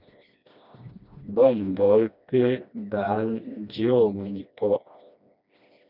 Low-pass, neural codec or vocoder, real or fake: 5.4 kHz; codec, 16 kHz, 1 kbps, FreqCodec, smaller model; fake